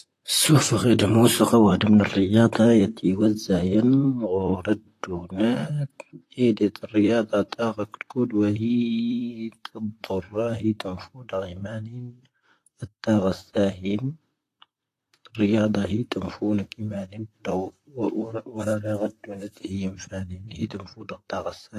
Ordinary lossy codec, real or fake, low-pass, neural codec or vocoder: AAC, 48 kbps; fake; 14.4 kHz; vocoder, 44.1 kHz, 128 mel bands, Pupu-Vocoder